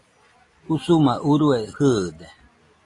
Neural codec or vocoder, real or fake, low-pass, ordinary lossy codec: none; real; 10.8 kHz; AAC, 32 kbps